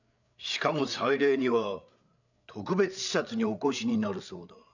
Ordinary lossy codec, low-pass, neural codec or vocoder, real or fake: none; 7.2 kHz; codec, 16 kHz, 8 kbps, FreqCodec, larger model; fake